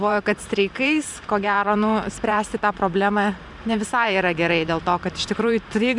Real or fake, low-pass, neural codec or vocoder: fake; 10.8 kHz; vocoder, 44.1 kHz, 128 mel bands every 512 samples, BigVGAN v2